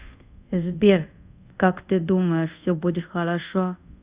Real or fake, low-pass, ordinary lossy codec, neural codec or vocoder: fake; 3.6 kHz; Opus, 64 kbps; codec, 24 kHz, 0.5 kbps, DualCodec